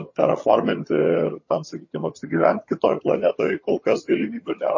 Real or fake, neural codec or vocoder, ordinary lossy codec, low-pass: fake; vocoder, 22.05 kHz, 80 mel bands, HiFi-GAN; MP3, 32 kbps; 7.2 kHz